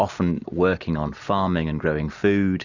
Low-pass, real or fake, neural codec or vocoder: 7.2 kHz; real; none